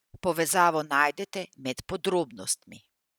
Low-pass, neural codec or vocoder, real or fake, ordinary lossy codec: none; none; real; none